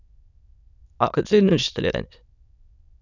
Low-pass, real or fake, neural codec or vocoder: 7.2 kHz; fake; autoencoder, 22.05 kHz, a latent of 192 numbers a frame, VITS, trained on many speakers